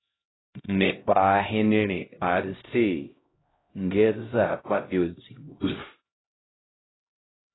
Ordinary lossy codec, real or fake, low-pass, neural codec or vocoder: AAC, 16 kbps; fake; 7.2 kHz; codec, 16 kHz, 0.5 kbps, X-Codec, HuBERT features, trained on LibriSpeech